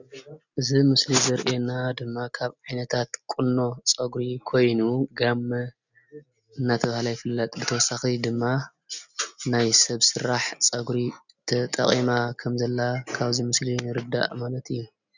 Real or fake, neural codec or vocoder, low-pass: real; none; 7.2 kHz